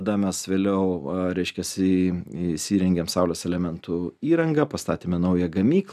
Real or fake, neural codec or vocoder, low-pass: real; none; 14.4 kHz